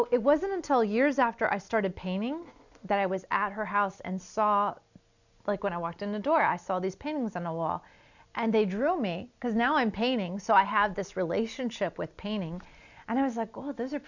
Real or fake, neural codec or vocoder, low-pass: real; none; 7.2 kHz